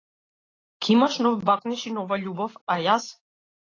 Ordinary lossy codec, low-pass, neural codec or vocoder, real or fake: AAC, 32 kbps; 7.2 kHz; none; real